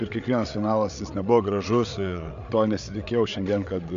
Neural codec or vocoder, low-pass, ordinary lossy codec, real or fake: codec, 16 kHz, 8 kbps, FreqCodec, larger model; 7.2 kHz; AAC, 64 kbps; fake